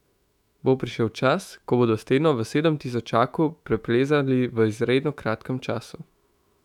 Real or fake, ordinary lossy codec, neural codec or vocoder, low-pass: fake; none; autoencoder, 48 kHz, 128 numbers a frame, DAC-VAE, trained on Japanese speech; 19.8 kHz